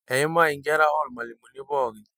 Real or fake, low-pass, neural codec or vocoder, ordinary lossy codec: real; none; none; none